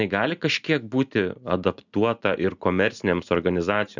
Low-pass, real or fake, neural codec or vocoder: 7.2 kHz; real; none